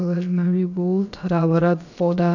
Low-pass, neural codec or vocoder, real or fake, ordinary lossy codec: 7.2 kHz; codec, 16 kHz, about 1 kbps, DyCAST, with the encoder's durations; fake; none